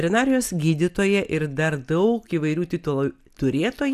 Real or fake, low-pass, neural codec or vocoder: real; 14.4 kHz; none